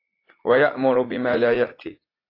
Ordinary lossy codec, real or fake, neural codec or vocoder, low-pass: AAC, 24 kbps; fake; vocoder, 44.1 kHz, 80 mel bands, Vocos; 5.4 kHz